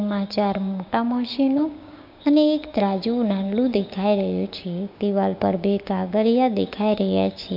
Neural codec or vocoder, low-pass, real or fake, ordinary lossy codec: codec, 16 kHz, 6 kbps, DAC; 5.4 kHz; fake; MP3, 48 kbps